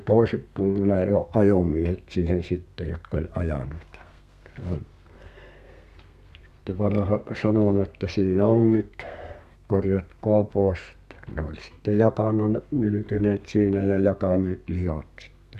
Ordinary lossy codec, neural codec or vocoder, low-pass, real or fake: none; codec, 32 kHz, 1.9 kbps, SNAC; 14.4 kHz; fake